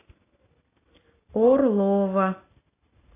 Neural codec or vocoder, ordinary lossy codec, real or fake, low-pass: none; AAC, 16 kbps; real; 3.6 kHz